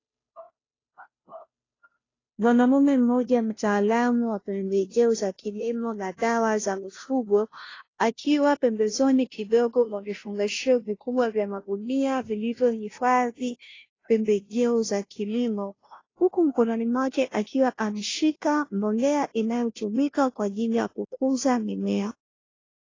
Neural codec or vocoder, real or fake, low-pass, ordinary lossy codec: codec, 16 kHz, 0.5 kbps, FunCodec, trained on Chinese and English, 25 frames a second; fake; 7.2 kHz; AAC, 32 kbps